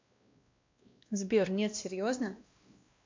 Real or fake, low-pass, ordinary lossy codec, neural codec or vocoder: fake; 7.2 kHz; MP3, 64 kbps; codec, 16 kHz, 1 kbps, X-Codec, WavLM features, trained on Multilingual LibriSpeech